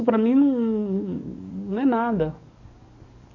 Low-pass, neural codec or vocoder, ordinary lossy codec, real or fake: 7.2 kHz; codec, 44.1 kHz, 7.8 kbps, DAC; none; fake